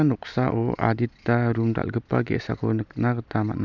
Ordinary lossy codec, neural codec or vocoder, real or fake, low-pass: none; none; real; 7.2 kHz